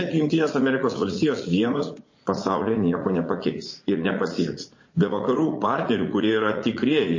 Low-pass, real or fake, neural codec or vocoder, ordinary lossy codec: 7.2 kHz; fake; vocoder, 44.1 kHz, 80 mel bands, Vocos; MP3, 32 kbps